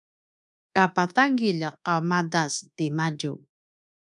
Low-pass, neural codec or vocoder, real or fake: 10.8 kHz; codec, 24 kHz, 1.2 kbps, DualCodec; fake